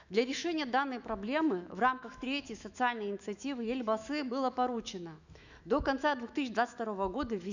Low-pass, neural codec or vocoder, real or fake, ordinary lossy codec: 7.2 kHz; autoencoder, 48 kHz, 128 numbers a frame, DAC-VAE, trained on Japanese speech; fake; none